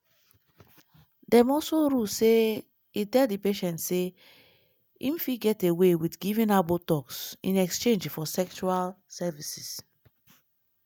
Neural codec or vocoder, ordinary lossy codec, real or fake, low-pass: none; none; real; 19.8 kHz